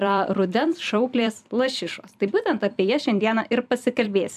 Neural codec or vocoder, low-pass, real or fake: vocoder, 44.1 kHz, 128 mel bands every 256 samples, BigVGAN v2; 14.4 kHz; fake